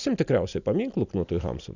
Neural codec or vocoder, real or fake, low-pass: none; real; 7.2 kHz